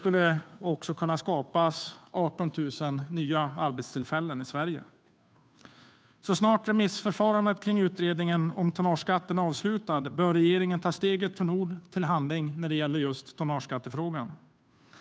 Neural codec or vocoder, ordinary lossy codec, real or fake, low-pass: codec, 16 kHz, 2 kbps, FunCodec, trained on Chinese and English, 25 frames a second; none; fake; none